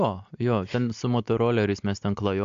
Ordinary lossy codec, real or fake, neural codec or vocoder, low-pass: MP3, 64 kbps; real; none; 7.2 kHz